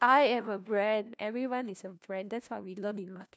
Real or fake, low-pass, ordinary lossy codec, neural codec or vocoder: fake; none; none; codec, 16 kHz, 1 kbps, FunCodec, trained on LibriTTS, 50 frames a second